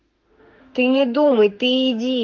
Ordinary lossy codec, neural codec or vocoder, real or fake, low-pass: Opus, 32 kbps; autoencoder, 48 kHz, 32 numbers a frame, DAC-VAE, trained on Japanese speech; fake; 7.2 kHz